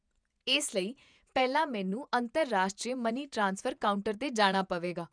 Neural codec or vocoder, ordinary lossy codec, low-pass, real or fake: vocoder, 48 kHz, 128 mel bands, Vocos; none; 9.9 kHz; fake